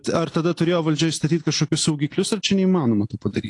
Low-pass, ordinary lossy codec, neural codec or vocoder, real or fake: 10.8 kHz; AAC, 48 kbps; none; real